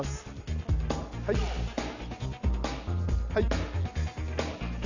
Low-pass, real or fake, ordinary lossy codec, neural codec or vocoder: 7.2 kHz; real; none; none